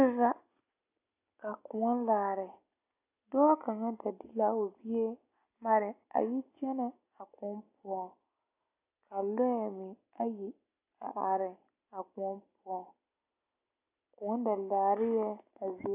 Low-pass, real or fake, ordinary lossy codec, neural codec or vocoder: 3.6 kHz; real; AAC, 24 kbps; none